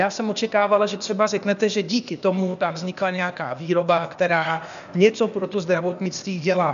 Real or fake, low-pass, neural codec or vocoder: fake; 7.2 kHz; codec, 16 kHz, 0.8 kbps, ZipCodec